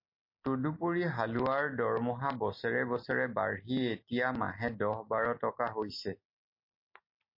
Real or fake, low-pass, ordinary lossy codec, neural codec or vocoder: real; 5.4 kHz; MP3, 32 kbps; none